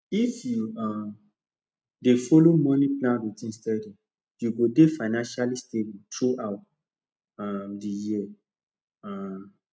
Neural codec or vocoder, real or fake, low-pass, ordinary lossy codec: none; real; none; none